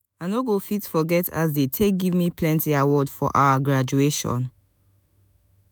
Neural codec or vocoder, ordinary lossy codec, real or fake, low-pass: autoencoder, 48 kHz, 128 numbers a frame, DAC-VAE, trained on Japanese speech; none; fake; none